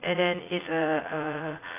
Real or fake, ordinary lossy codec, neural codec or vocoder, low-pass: fake; AAC, 24 kbps; vocoder, 44.1 kHz, 80 mel bands, Vocos; 3.6 kHz